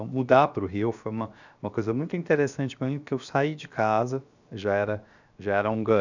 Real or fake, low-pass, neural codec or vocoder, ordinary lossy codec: fake; 7.2 kHz; codec, 16 kHz, 0.7 kbps, FocalCodec; none